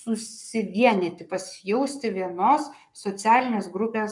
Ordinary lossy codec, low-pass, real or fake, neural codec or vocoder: MP3, 96 kbps; 10.8 kHz; fake; codec, 44.1 kHz, 7.8 kbps, Pupu-Codec